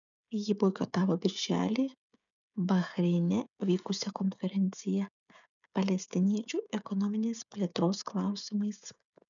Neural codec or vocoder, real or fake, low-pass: codec, 16 kHz, 16 kbps, FreqCodec, smaller model; fake; 7.2 kHz